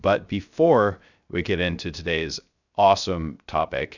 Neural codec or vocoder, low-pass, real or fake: codec, 16 kHz, 0.3 kbps, FocalCodec; 7.2 kHz; fake